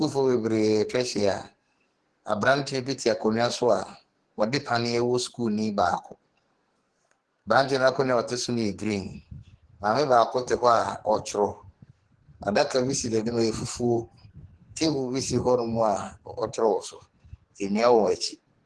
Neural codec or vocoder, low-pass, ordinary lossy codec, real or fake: codec, 44.1 kHz, 2.6 kbps, SNAC; 10.8 kHz; Opus, 16 kbps; fake